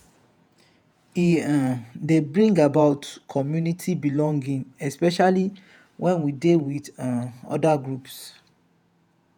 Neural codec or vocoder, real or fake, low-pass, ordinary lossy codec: vocoder, 48 kHz, 128 mel bands, Vocos; fake; 19.8 kHz; none